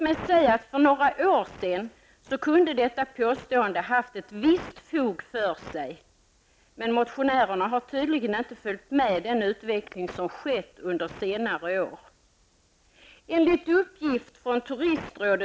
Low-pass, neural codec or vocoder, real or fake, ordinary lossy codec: none; none; real; none